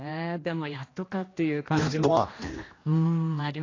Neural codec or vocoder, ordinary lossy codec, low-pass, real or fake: codec, 16 kHz, 1 kbps, X-Codec, HuBERT features, trained on general audio; AAC, 32 kbps; 7.2 kHz; fake